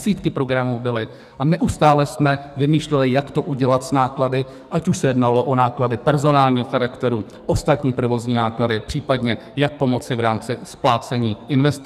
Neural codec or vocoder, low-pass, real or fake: codec, 44.1 kHz, 2.6 kbps, SNAC; 14.4 kHz; fake